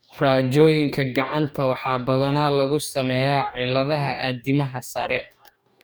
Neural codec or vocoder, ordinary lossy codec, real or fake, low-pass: codec, 44.1 kHz, 2.6 kbps, DAC; none; fake; none